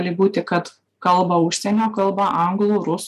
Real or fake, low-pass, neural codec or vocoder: real; 14.4 kHz; none